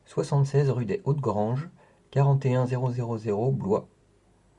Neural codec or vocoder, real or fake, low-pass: none; real; 10.8 kHz